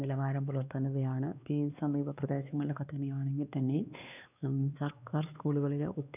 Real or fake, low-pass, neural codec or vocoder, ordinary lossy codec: fake; 3.6 kHz; codec, 16 kHz, 2 kbps, X-Codec, WavLM features, trained on Multilingual LibriSpeech; none